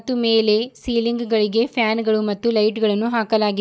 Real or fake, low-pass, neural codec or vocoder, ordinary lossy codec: real; none; none; none